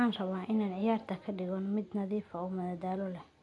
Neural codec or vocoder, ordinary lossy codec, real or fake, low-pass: none; none; real; none